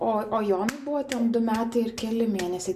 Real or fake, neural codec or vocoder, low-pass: real; none; 14.4 kHz